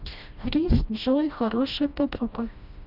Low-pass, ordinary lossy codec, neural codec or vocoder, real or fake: 5.4 kHz; none; codec, 16 kHz, 1 kbps, FreqCodec, smaller model; fake